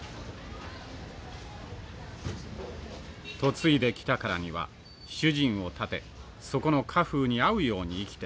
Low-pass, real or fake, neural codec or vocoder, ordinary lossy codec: none; real; none; none